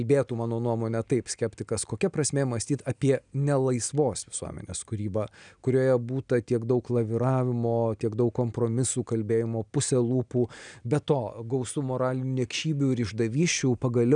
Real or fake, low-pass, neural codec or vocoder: real; 9.9 kHz; none